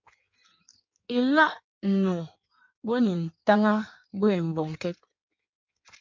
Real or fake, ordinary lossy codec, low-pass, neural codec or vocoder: fake; MP3, 48 kbps; 7.2 kHz; codec, 16 kHz in and 24 kHz out, 1.1 kbps, FireRedTTS-2 codec